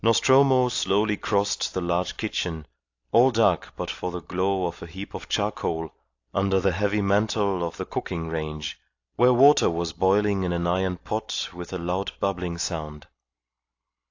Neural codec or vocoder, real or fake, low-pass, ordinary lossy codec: none; real; 7.2 kHz; AAC, 48 kbps